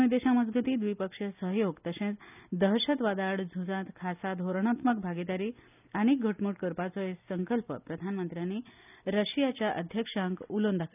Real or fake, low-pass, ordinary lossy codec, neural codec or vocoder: real; 3.6 kHz; none; none